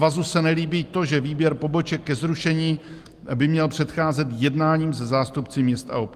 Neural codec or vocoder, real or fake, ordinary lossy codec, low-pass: none; real; Opus, 24 kbps; 14.4 kHz